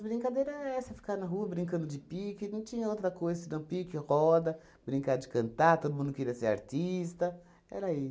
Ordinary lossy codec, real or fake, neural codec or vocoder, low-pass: none; real; none; none